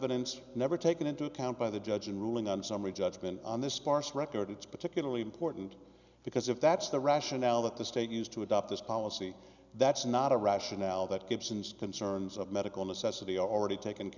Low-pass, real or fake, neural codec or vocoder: 7.2 kHz; real; none